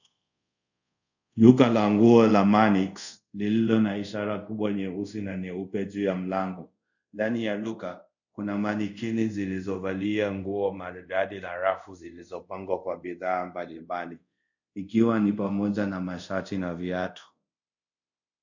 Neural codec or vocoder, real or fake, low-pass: codec, 24 kHz, 0.5 kbps, DualCodec; fake; 7.2 kHz